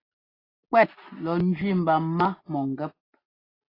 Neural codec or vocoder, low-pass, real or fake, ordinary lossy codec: none; 5.4 kHz; real; AAC, 24 kbps